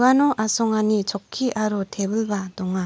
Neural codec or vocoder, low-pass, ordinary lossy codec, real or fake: none; none; none; real